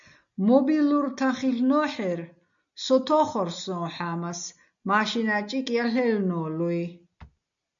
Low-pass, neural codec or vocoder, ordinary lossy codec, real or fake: 7.2 kHz; none; MP3, 64 kbps; real